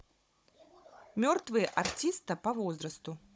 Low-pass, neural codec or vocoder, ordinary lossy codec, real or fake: none; codec, 16 kHz, 16 kbps, FunCodec, trained on Chinese and English, 50 frames a second; none; fake